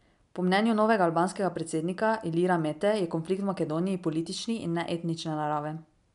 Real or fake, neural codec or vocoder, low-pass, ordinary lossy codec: real; none; 10.8 kHz; none